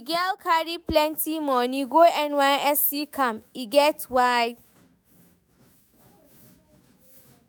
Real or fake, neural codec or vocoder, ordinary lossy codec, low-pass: fake; autoencoder, 48 kHz, 128 numbers a frame, DAC-VAE, trained on Japanese speech; none; none